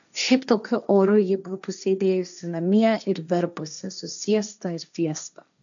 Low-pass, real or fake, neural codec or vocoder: 7.2 kHz; fake; codec, 16 kHz, 1.1 kbps, Voila-Tokenizer